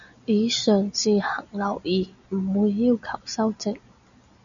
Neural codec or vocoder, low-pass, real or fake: none; 7.2 kHz; real